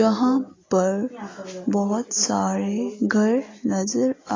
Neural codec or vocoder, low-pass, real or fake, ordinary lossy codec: none; 7.2 kHz; real; AAC, 32 kbps